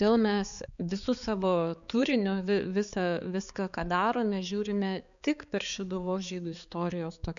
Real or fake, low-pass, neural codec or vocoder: fake; 7.2 kHz; codec, 16 kHz, 4 kbps, X-Codec, HuBERT features, trained on balanced general audio